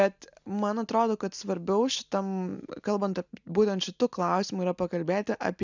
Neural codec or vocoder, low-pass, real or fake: none; 7.2 kHz; real